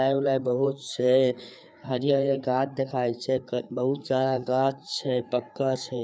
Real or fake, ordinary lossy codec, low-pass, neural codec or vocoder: fake; none; none; codec, 16 kHz, 4 kbps, FreqCodec, larger model